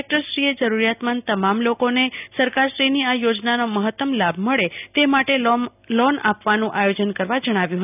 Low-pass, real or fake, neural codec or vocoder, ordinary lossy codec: 3.6 kHz; real; none; none